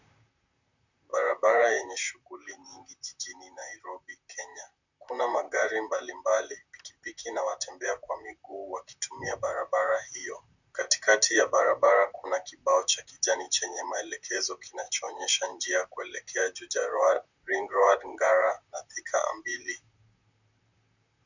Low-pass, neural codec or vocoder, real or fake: 7.2 kHz; vocoder, 44.1 kHz, 128 mel bands, Pupu-Vocoder; fake